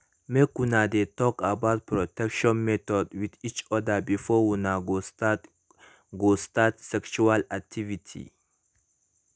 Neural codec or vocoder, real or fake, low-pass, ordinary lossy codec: none; real; none; none